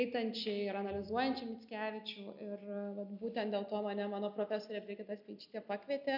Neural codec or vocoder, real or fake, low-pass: none; real; 5.4 kHz